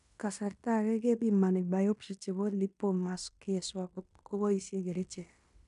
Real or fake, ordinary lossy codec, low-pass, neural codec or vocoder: fake; none; 10.8 kHz; codec, 16 kHz in and 24 kHz out, 0.9 kbps, LongCat-Audio-Codec, fine tuned four codebook decoder